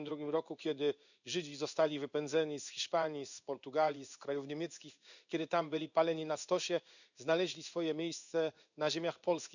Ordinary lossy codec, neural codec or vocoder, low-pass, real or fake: none; codec, 16 kHz in and 24 kHz out, 1 kbps, XY-Tokenizer; 7.2 kHz; fake